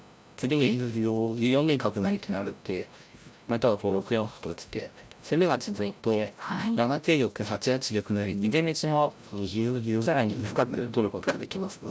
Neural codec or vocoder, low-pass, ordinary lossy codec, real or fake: codec, 16 kHz, 0.5 kbps, FreqCodec, larger model; none; none; fake